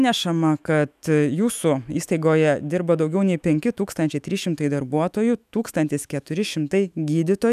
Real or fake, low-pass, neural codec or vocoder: fake; 14.4 kHz; autoencoder, 48 kHz, 128 numbers a frame, DAC-VAE, trained on Japanese speech